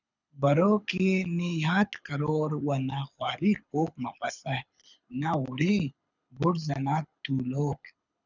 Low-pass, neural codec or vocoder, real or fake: 7.2 kHz; codec, 24 kHz, 6 kbps, HILCodec; fake